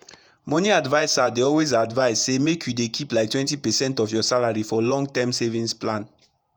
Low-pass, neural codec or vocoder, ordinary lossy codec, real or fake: none; vocoder, 48 kHz, 128 mel bands, Vocos; none; fake